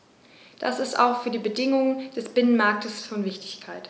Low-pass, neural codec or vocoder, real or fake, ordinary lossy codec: none; none; real; none